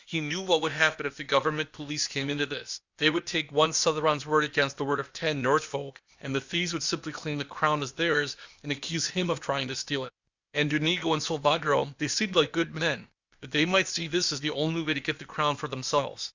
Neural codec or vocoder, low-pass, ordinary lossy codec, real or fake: codec, 16 kHz, 0.8 kbps, ZipCodec; 7.2 kHz; Opus, 64 kbps; fake